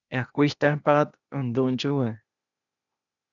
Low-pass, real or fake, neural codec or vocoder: 7.2 kHz; fake; codec, 16 kHz, 0.8 kbps, ZipCodec